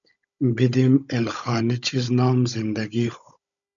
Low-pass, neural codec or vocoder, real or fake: 7.2 kHz; codec, 16 kHz, 16 kbps, FunCodec, trained on Chinese and English, 50 frames a second; fake